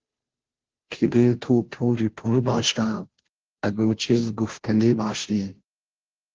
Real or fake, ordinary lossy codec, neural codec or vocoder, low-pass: fake; Opus, 16 kbps; codec, 16 kHz, 0.5 kbps, FunCodec, trained on Chinese and English, 25 frames a second; 7.2 kHz